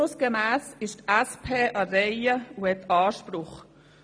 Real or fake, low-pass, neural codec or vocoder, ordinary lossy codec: real; none; none; none